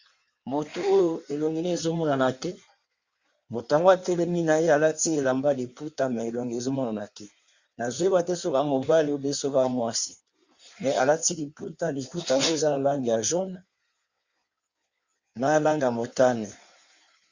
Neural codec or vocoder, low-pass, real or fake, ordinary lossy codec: codec, 16 kHz in and 24 kHz out, 1.1 kbps, FireRedTTS-2 codec; 7.2 kHz; fake; Opus, 64 kbps